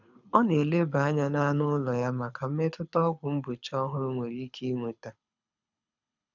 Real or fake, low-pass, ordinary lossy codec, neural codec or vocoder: fake; 7.2 kHz; none; codec, 24 kHz, 6 kbps, HILCodec